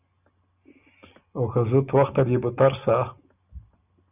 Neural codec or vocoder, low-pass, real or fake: none; 3.6 kHz; real